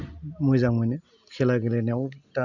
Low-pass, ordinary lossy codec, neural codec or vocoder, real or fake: 7.2 kHz; none; none; real